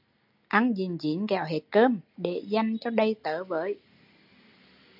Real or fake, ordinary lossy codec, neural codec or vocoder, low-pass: real; AAC, 48 kbps; none; 5.4 kHz